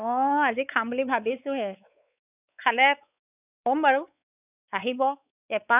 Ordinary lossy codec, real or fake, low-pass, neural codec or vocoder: none; fake; 3.6 kHz; codec, 16 kHz, 4 kbps, X-Codec, WavLM features, trained on Multilingual LibriSpeech